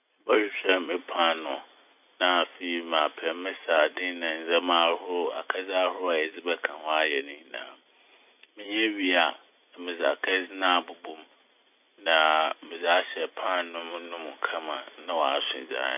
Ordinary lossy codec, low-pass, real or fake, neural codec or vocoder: none; 3.6 kHz; real; none